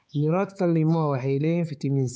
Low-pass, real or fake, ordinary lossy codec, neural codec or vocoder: none; fake; none; codec, 16 kHz, 4 kbps, X-Codec, HuBERT features, trained on balanced general audio